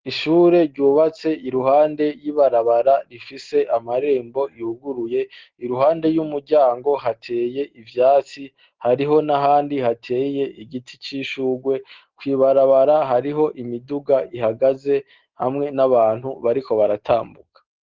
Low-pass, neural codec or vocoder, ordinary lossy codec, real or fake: 7.2 kHz; none; Opus, 16 kbps; real